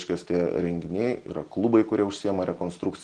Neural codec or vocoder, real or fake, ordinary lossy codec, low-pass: none; real; Opus, 16 kbps; 9.9 kHz